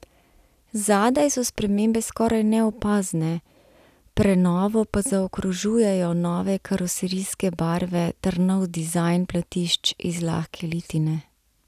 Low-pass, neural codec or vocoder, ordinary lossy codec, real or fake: 14.4 kHz; none; none; real